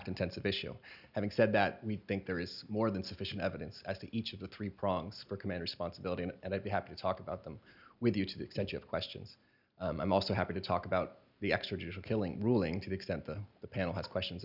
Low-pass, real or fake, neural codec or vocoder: 5.4 kHz; real; none